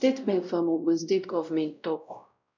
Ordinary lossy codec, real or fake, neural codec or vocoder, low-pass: none; fake; codec, 16 kHz, 0.5 kbps, X-Codec, WavLM features, trained on Multilingual LibriSpeech; 7.2 kHz